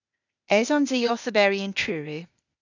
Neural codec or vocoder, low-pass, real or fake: codec, 16 kHz, 0.8 kbps, ZipCodec; 7.2 kHz; fake